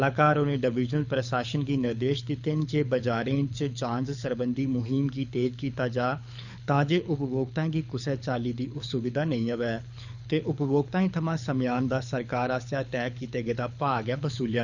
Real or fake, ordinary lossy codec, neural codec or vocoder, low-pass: fake; none; codec, 24 kHz, 6 kbps, HILCodec; 7.2 kHz